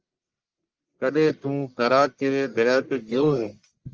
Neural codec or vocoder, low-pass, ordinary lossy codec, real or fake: codec, 44.1 kHz, 1.7 kbps, Pupu-Codec; 7.2 kHz; Opus, 24 kbps; fake